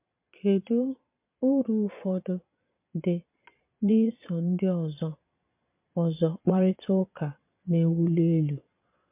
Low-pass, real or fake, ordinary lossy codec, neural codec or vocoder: 3.6 kHz; fake; MP3, 32 kbps; vocoder, 22.05 kHz, 80 mel bands, Vocos